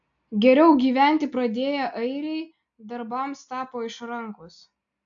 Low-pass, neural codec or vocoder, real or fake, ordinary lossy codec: 7.2 kHz; none; real; AAC, 64 kbps